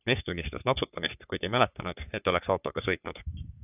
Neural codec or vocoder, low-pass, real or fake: codec, 44.1 kHz, 3.4 kbps, Pupu-Codec; 3.6 kHz; fake